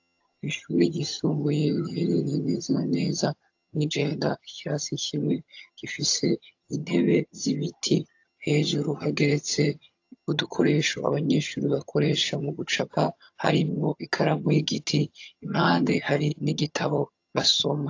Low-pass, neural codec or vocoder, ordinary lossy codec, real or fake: 7.2 kHz; vocoder, 22.05 kHz, 80 mel bands, HiFi-GAN; AAC, 48 kbps; fake